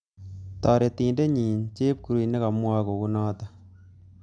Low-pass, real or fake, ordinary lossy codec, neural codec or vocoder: 9.9 kHz; real; none; none